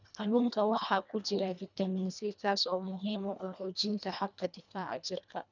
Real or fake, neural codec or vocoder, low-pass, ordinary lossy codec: fake; codec, 24 kHz, 1.5 kbps, HILCodec; 7.2 kHz; none